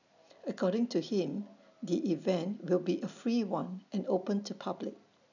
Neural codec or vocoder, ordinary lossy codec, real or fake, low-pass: none; none; real; 7.2 kHz